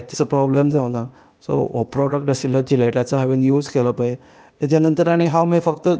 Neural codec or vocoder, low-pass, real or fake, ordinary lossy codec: codec, 16 kHz, 0.8 kbps, ZipCodec; none; fake; none